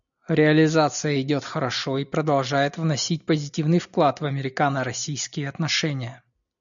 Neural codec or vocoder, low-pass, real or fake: none; 7.2 kHz; real